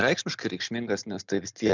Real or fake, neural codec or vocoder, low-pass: real; none; 7.2 kHz